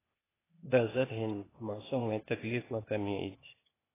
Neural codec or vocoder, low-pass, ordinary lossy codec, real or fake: codec, 16 kHz, 0.8 kbps, ZipCodec; 3.6 kHz; AAC, 16 kbps; fake